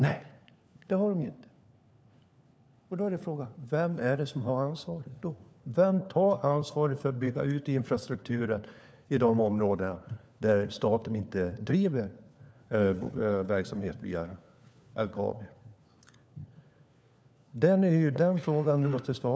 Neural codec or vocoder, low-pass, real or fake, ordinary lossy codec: codec, 16 kHz, 4 kbps, FunCodec, trained on LibriTTS, 50 frames a second; none; fake; none